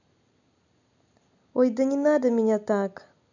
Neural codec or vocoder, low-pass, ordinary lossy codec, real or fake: none; 7.2 kHz; none; real